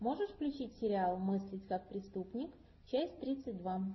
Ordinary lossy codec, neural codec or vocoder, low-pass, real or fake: MP3, 24 kbps; none; 7.2 kHz; real